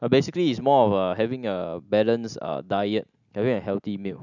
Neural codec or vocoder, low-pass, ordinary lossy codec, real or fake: none; 7.2 kHz; none; real